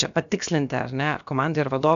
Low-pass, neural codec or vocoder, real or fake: 7.2 kHz; codec, 16 kHz, about 1 kbps, DyCAST, with the encoder's durations; fake